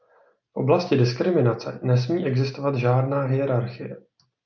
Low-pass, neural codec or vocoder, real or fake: 7.2 kHz; none; real